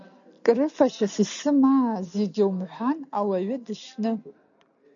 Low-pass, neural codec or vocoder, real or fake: 7.2 kHz; none; real